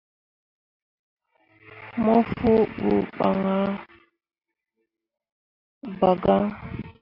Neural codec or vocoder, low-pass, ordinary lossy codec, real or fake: none; 5.4 kHz; AAC, 32 kbps; real